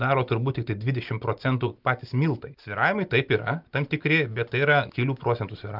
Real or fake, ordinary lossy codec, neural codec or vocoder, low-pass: real; Opus, 24 kbps; none; 5.4 kHz